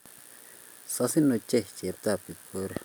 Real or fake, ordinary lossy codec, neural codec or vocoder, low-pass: real; none; none; none